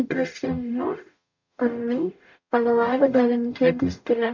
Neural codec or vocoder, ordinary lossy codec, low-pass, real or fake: codec, 44.1 kHz, 0.9 kbps, DAC; MP3, 64 kbps; 7.2 kHz; fake